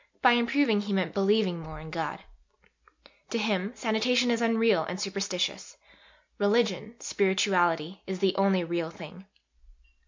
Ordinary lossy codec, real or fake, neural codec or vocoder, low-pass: MP3, 64 kbps; real; none; 7.2 kHz